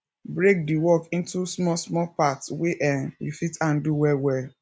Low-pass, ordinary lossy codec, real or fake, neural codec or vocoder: none; none; real; none